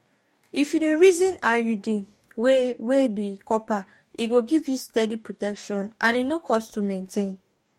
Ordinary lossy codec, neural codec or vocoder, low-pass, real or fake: MP3, 64 kbps; codec, 44.1 kHz, 2.6 kbps, DAC; 19.8 kHz; fake